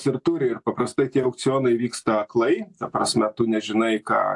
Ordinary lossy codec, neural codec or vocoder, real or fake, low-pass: AAC, 64 kbps; none; real; 10.8 kHz